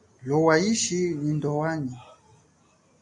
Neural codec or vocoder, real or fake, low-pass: none; real; 10.8 kHz